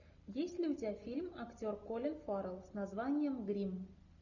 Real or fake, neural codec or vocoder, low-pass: real; none; 7.2 kHz